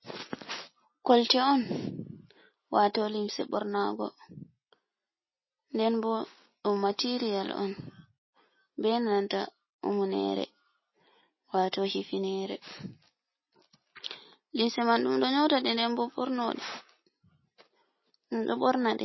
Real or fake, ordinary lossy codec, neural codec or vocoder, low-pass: real; MP3, 24 kbps; none; 7.2 kHz